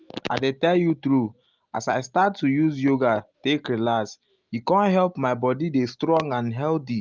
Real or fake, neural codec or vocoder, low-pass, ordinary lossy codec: real; none; 7.2 kHz; Opus, 24 kbps